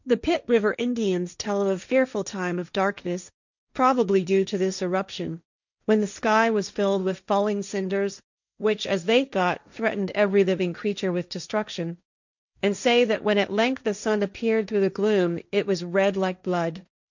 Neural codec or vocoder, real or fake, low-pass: codec, 16 kHz, 1.1 kbps, Voila-Tokenizer; fake; 7.2 kHz